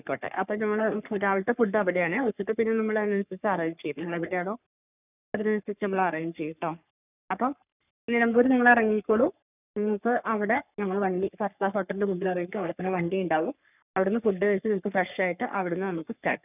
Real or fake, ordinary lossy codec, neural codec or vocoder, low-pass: fake; none; codec, 44.1 kHz, 3.4 kbps, Pupu-Codec; 3.6 kHz